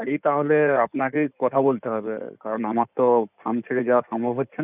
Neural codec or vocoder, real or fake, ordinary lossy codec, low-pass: codec, 16 kHz in and 24 kHz out, 2.2 kbps, FireRedTTS-2 codec; fake; none; 3.6 kHz